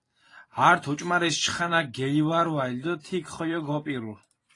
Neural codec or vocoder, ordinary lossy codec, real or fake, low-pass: vocoder, 44.1 kHz, 128 mel bands every 512 samples, BigVGAN v2; AAC, 32 kbps; fake; 10.8 kHz